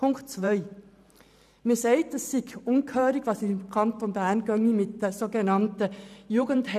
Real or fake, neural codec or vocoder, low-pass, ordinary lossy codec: fake; vocoder, 44.1 kHz, 128 mel bands every 512 samples, BigVGAN v2; 14.4 kHz; none